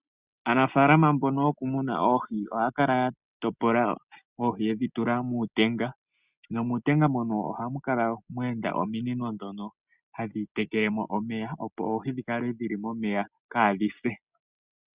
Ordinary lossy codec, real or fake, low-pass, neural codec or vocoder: Opus, 64 kbps; real; 3.6 kHz; none